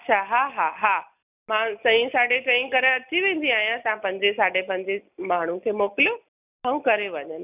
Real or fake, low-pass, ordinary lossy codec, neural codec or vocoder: real; 3.6 kHz; none; none